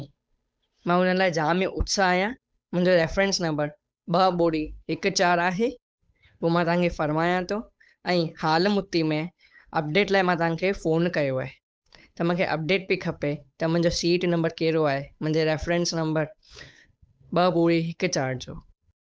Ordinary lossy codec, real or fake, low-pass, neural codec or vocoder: none; fake; none; codec, 16 kHz, 8 kbps, FunCodec, trained on Chinese and English, 25 frames a second